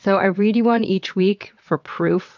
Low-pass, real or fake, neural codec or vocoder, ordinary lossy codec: 7.2 kHz; fake; vocoder, 22.05 kHz, 80 mel bands, WaveNeXt; MP3, 64 kbps